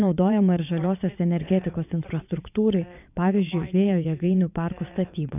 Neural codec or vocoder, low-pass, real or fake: vocoder, 22.05 kHz, 80 mel bands, WaveNeXt; 3.6 kHz; fake